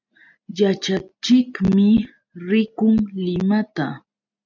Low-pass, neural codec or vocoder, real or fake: 7.2 kHz; none; real